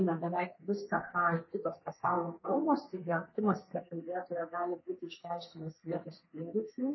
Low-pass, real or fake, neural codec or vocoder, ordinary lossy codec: 7.2 kHz; fake; codec, 32 kHz, 1.9 kbps, SNAC; MP3, 24 kbps